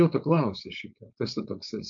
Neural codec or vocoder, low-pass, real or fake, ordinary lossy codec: codec, 16 kHz, 4.8 kbps, FACodec; 7.2 kHz; fake; MP3, 64 kbps